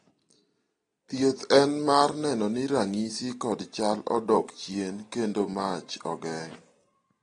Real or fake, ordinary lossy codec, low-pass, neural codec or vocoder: real; AAC, 32 kbps; 9.9 kHz; none